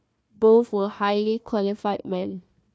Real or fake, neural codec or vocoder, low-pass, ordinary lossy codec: fake; codec, 16 kHz, 1 kbps, FunCodec, trained on Chinese and English, 50 frames a second; none; none